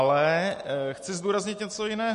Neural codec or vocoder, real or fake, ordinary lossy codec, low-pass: none; real; MP3, 48 kbps; 14.4 kHz